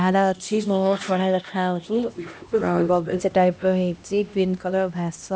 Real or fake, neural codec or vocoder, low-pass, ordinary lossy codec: fake; codec, 16 kHz, 1 kbps, X-Codec, HuBERT features, trained on LibriSpeech; none; none